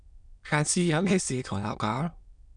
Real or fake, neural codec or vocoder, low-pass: fake; autoencoder, 22.05 kHz, a latent of 192 numbers a frame, VITS, trained on many speakers; 9.9 kHz